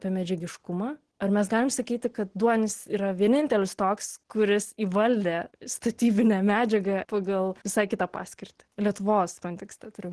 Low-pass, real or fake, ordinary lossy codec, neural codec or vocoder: 10.8 kHz; real; Opus, 16 kbps; none